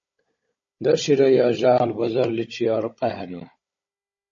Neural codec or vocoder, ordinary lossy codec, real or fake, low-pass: codec, 16 kHz, 16 kbps, FunCodec, trained on Chinese and English, 50 frames a second; MP3, 32 kbps; fake; 7.2 kHz